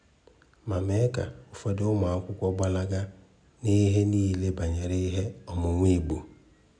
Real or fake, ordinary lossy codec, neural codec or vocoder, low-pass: real; none; none; 9.9 kHz